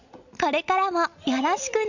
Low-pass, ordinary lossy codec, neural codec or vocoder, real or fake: 7.2 kHz; none; none; real